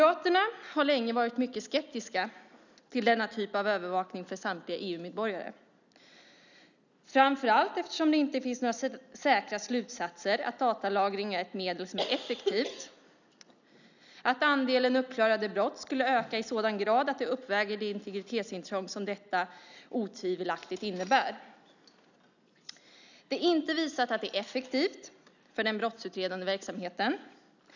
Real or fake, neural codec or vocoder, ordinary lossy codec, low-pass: real; none; none; 7.2 kHz